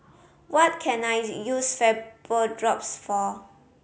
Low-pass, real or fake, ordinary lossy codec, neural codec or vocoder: none; real; none; none